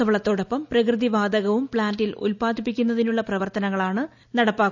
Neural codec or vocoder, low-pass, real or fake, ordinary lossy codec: none; 7.2 kHz; real; none